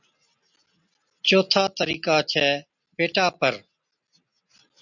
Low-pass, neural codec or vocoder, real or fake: 7.2 kHz; none; real